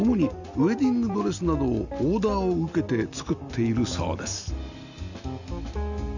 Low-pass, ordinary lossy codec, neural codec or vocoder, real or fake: 7.2 kHz; none; none; real